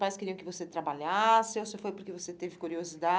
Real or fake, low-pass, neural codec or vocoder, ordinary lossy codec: real; none; none; none